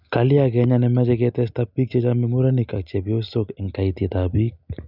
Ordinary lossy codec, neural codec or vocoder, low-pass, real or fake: none; none; 5.4 kHz; real